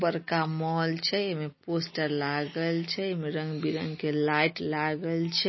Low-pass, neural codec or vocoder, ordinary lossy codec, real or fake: 7.2 kHz; none; MP3, 24 kbps; real